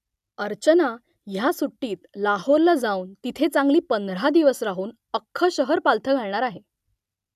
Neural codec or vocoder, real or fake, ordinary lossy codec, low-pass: none; real; none; 14.4 kHz